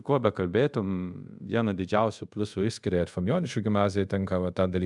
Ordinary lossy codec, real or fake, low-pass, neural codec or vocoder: MP3, 96 kbps; fake; 10.8 kHz; codec, 24 kHz, 0.5 kbps, DualCodec